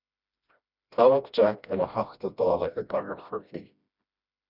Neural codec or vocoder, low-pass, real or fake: codec, 16 kHz, 1 kbps, FreqCodec, smaller model; 5.4 kHz; fake